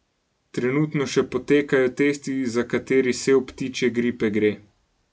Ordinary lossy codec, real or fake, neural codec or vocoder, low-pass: none; real; none; none